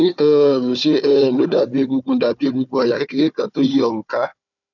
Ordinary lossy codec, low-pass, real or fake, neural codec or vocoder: none; 7.2 kHz; fake; codec, 16 kHz, 4 kbps, FunCodec, trained on Chinese and English, 50 frames a second